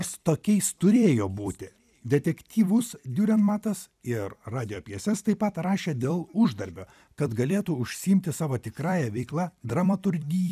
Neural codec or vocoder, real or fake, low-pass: vocoder, 44.1 kHz, 128 mel bands every 256 samples, BigVGAN v2; fake; 14.4 kHz